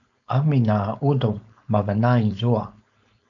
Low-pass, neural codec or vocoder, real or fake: 7.2 kHz; codec, 16 kHz, 4.8 kbps, FACodec; fake